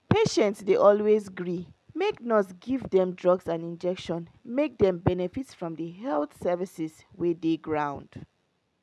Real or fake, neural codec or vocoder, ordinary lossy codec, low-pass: real; none; none; none